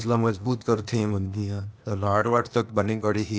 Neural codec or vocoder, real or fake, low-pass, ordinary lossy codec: codec, 16 kHz, 0.8 kbps, ZipCodec; fake; none; none